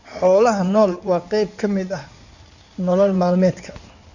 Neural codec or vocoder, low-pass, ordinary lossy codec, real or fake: codec, 16 kHz in and 24 kHz out, 2.2 kbps, FireRedTTS-2 codec; 7.2 kHz; none; fake